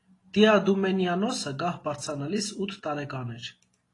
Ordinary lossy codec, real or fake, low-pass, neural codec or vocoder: AAC, 32 kbps; real; 10.8 kHz; none